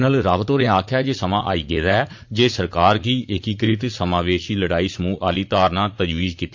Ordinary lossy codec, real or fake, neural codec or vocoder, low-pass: MP3, 48 kbps; fake; vocoder, 44.1 kHz, 80 mel bands, Vocos; 7.2 kHz